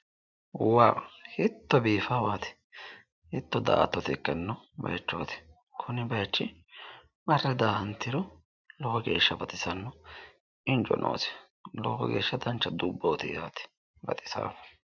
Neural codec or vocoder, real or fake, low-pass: none; real; 7.2 kHz